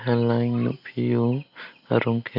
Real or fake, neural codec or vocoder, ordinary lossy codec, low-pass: real; none; none; 5.4 kHz